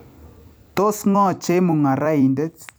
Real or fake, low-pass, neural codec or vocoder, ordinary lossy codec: fake; none; vocoder, 44.1 kHz, 128 mel bands every 256 samples, BigVGAN v2; none